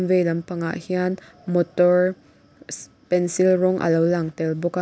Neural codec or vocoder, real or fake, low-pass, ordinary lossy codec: none; real; none; none